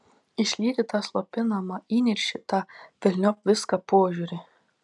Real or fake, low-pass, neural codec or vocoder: real; 10.8 kHz; none